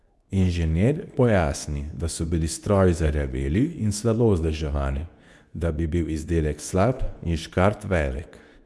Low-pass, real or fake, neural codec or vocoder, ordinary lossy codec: none; fake; codec, 24 kHz, 0.9 kbps, WavTokenizer, medium speech release version 2; none